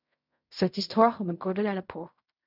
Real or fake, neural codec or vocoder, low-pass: fake; codec, 16 kHz in and 24 kHz out, 0.4 kbps, LongCat-Audio-Codec, fine tuned four codebook decoder; 5.4 kHz